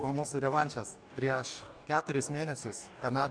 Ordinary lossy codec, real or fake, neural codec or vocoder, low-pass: MP3, 96 kbps; fake; codec, 44.1 kHz, 2.6 kbps, DAC; 9.9 kHz